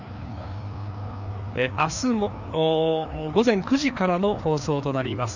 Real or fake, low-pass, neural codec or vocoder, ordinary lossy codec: fake; 7.2 kHz; codec, 16 kHz, 2 kbps, FreqCodec, larger model; none